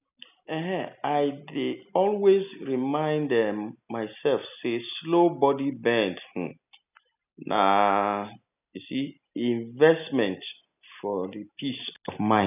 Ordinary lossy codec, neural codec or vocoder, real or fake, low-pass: none; none; real; 3.6 kHz